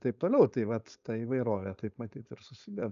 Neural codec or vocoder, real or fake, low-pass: codec, 16 kHz, 4.8 kbps, FACodec; fake; 7.2 kHz